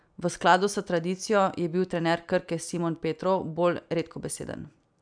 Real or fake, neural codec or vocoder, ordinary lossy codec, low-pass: real; none; none; 9.9 kHz